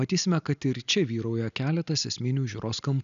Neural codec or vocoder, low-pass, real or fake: none; 7.2 kHz; real